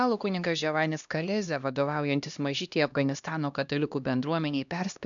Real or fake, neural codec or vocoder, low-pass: fake; codec, 16 kHz, 1 kbps, X-Codec, HuBERT features, trained on LibriSpeech; 7.2 kHz